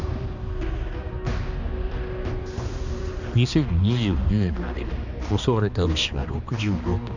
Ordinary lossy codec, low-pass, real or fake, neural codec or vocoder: none; 7.2 kHz; fake; codec, 16 kHz, 2 kbps, X-Codec, HuBERT features, trained on balanced general audio